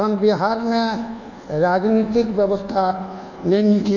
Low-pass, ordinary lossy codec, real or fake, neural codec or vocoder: 7.2 kHz; none; fake; codec, 24 kHz, 1.2 kbps, DualCodec